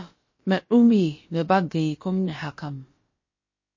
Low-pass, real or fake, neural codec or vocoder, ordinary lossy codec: 7.2 kHz; fake; codec, 16 kHz, about 1 kbps, DyCAST, with the encoder's durations; MP3, 32 kbps